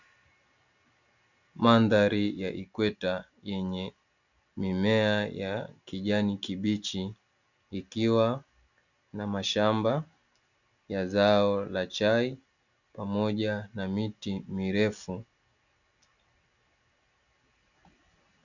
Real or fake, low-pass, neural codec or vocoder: real; 7.2 kHz; none